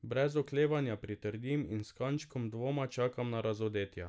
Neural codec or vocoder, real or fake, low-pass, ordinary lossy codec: none; real; none; none